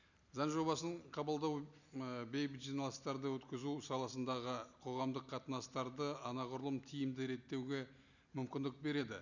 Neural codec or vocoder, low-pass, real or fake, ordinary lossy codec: none; 7.2 kHz; real; none